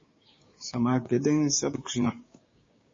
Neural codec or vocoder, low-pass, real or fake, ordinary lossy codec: codec, 16 kHz, 6 kbps, DAC; 7.2 kHz; fake; MP3, 32 kbps